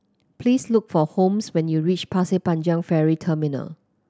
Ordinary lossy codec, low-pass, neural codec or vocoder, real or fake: none; none; none; real